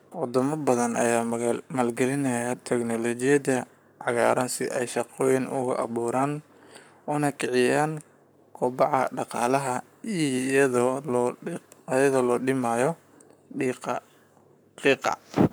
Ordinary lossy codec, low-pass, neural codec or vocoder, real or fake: none; none; codec, 44.1 kHz, 7.8 kbps, Pupu-Codec; fake